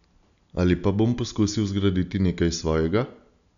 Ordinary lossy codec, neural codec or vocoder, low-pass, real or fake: none; none; 7.2 kHz; real